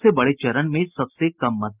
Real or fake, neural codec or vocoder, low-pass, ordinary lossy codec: real; none; 3.6 kHz; Opus, 24 kbps